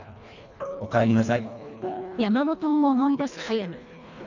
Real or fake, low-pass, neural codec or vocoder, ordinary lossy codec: fake; 7.2 kHz; codec, 24 kHz, 1.5 kbps, HILCodec; none